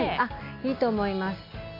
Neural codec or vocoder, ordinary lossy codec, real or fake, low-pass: none; AAC, 32 kbps; real; 5.4 kHz